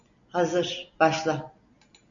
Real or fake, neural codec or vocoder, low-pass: real; none; 7.2 kHz